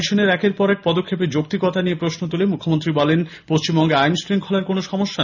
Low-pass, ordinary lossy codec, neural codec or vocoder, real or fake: 7.2 kHz; none; none; real